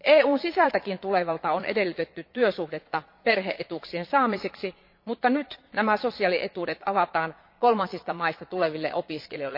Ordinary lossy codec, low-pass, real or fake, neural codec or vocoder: MP3, 32 kbps; 5.4 kHz; fake; vocoder, 22.05 kHz, 80 mel bands, Vocos